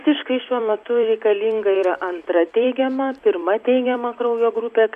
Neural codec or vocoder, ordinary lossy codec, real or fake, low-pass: vocoder, 44.1 kHz, 128 mel bands every 256 samples, BigVGAN v2; AAC, 96 kbps; fake; 14.4 kHz